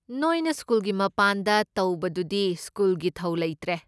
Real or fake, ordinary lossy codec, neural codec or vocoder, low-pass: real; none; none; none